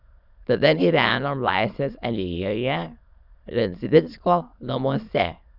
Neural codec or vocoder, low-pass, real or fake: autoencoder, 22.05 kHz, a latent of 192 numbers a frame, VITS, trained on many speakers; 5.4 kHz; fake